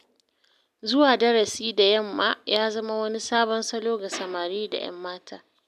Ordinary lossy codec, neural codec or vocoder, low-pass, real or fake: none; none; 14.4 kHz; real